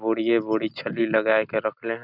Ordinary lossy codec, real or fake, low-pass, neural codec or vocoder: none; real; 5.4 kHz; none